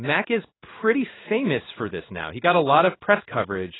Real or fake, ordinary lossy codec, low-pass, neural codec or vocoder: fake; AAC, 16 kbps; 7.2 kHz; codec, 16 kHz in and 24 kHz out, 1 kbps, XY-Tokenizer